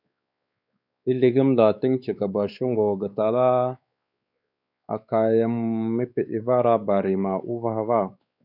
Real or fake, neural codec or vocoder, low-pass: fake; codec, 16 kHz, 4 kbps, X-Codec, WavLM features, trained on Multilingual LibriSpeech; 5.4 kHz